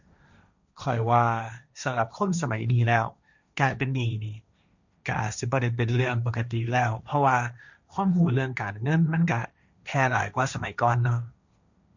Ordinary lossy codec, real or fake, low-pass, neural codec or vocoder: none; fake; 7.2 kHz; codec, 16 kHz, 1.1 kbps, Voila-Tokenizer